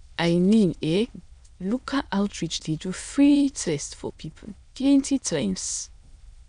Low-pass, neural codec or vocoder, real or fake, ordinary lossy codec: 9.9 kHz; autoencoder, 22.05 kHz, a latent of 192 numbers a frame, VITS, trained on many speakers; fake; none